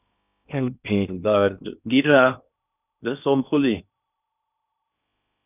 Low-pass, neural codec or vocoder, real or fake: 3.6 kHz; codec, 16 kHz in and 24 kHz out, 0.6 kbps, FocalCodec, streaming, 2048 codes; fake